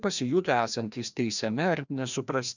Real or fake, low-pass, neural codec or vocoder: fake; 7.2 kHz; codec, 16 kHz, 1 kbps, FreqCodec, larger model